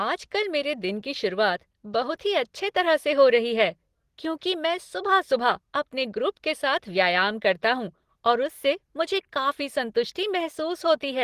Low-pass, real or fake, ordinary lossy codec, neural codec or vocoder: 14.4 kHz; real; Opus, 16 kbps; none